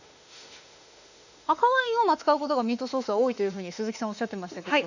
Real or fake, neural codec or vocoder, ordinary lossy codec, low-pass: fake; autoencoder, 48 kHz, 32 numbers a frame, DAC-VAE, trained on Japanese speech; none; 7.2 kHz